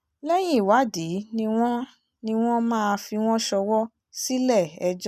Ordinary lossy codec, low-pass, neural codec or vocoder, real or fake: none; 14.4 kHz; none; real